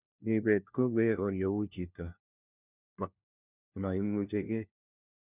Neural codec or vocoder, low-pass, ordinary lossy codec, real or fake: codec, 16 kHz, 1 kbps, FunCodec, trained on LibriTTS, 50 frames a second; 3.6 kHz; none; fake